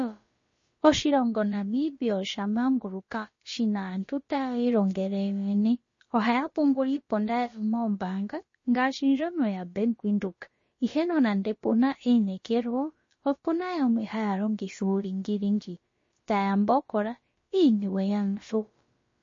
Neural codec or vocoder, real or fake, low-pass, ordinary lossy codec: codec, 16 kHz, about 1 kbps, DyCAST, with the encoder's durations; fake; 7.2 kHz; MP3, 32 kbps